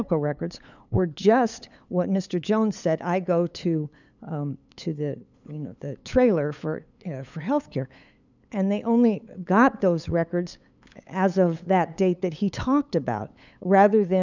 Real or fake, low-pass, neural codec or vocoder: fake; 7.2 kHz; codec, 16 kHz, 8 kbps, FunCodec, trained on LibriTTS, 25 frames a second